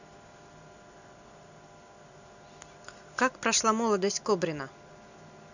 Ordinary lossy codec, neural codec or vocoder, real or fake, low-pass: none; none; real; 7.2 kHz